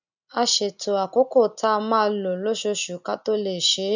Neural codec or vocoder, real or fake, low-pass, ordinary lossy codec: none; real; 7.2 kHz; none